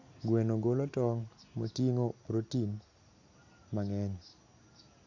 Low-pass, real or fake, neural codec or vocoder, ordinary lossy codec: 7.2 kHz; real; none; none